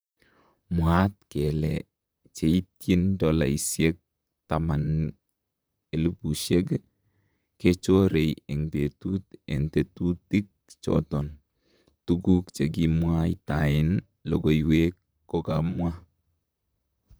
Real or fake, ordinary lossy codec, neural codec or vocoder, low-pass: fake; none; vocoder, 44.1 kHz, 128 mel bands, Pupu-Vocoder; none